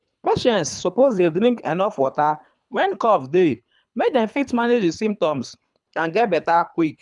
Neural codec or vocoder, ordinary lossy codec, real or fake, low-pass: codec, 24 kHz, 3 kbps, HILCodec; none; fake; 10.8 kHz